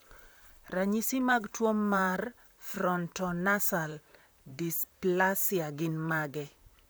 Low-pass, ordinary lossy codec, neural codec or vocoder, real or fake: none; none; vocoder, 44.1 kHz, 128 mel bands, Pupu-Vocoder; fake